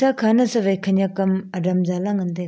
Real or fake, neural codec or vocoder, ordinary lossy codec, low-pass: real; none; none; none